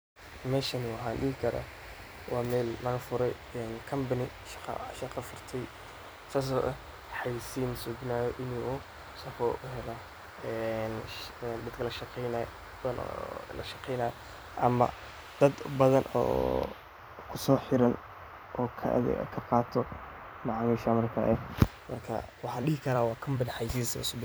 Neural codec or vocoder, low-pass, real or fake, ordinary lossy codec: vocoder, 44.1 kHz, 128 mel bands every 256 samples, BigVGAN v2; none; fake; none